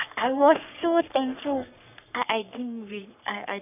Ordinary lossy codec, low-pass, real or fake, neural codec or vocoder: none; 3.6 kHz; fake; codec, 44.1 kHz, 3.4 kbps, Pupu-Codec